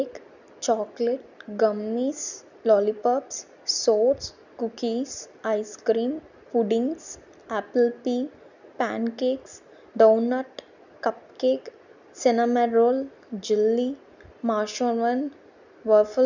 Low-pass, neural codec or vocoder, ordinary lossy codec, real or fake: 7.2 kHz; none; none; real